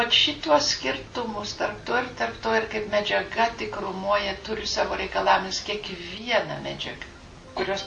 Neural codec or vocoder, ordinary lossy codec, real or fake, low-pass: none; AAC, 64 kbps; real; 10.8 kHz